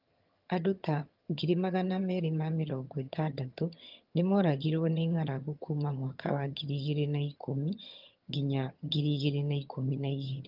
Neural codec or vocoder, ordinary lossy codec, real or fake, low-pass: vocoder, 22.05 kHz, 80 mel bands, HiFi-GAN; Opus, 32 kbps; fake; 5.4 kHz